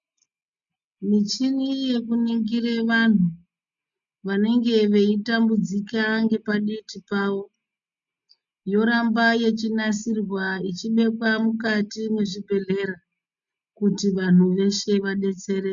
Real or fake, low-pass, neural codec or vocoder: real; 7.2 kHz; none